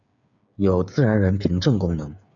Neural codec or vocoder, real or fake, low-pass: codec, 16 kHz, 8 kbps, FreqCodec, smaller model; fake; 7.2 kHz